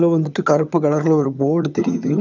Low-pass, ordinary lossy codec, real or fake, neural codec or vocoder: 7.2 kHz; none; fake; vocoder, 22.05 kHz, 80 mel bands, HiFi-GAN